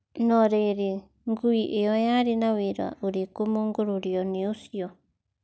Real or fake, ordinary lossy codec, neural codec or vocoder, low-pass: real; none; none; none